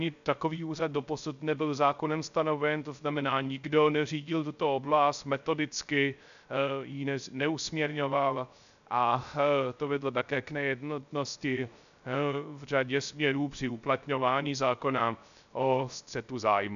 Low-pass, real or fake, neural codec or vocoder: 7.2 kHz; fake; codec, 16 kHz, 0.3 kbps, FocalCodec